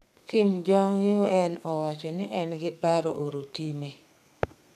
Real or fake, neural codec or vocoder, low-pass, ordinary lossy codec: fake; codec, 32 kHz, 1.9 kbps, SNAC; 14.4 kHz; none